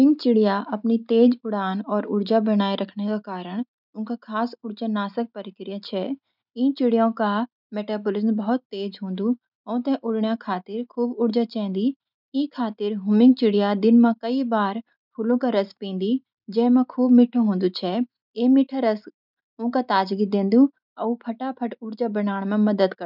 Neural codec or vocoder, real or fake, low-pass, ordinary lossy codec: none; real; 5.4 kHz; none